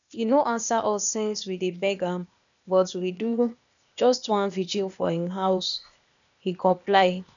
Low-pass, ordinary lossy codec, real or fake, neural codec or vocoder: 7.2 kHz; none; fake; codec, 16 kHz, 0.8 kbps, ZipCodec